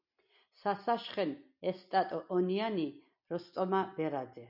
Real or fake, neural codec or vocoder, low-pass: real; none; 5.4 kHz